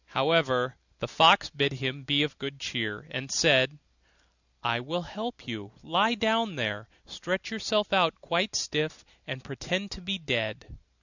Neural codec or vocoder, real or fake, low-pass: none; real; 7.2 kHz